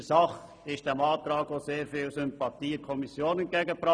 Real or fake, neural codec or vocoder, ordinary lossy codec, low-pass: real; none; none; none